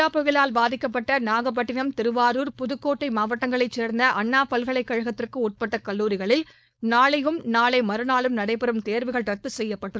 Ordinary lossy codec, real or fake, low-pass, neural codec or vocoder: none; fake; none; codec, 16 kHz, 4.8 kbps, FACodec